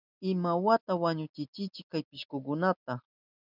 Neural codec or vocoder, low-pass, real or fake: none; 5.4 kHz; real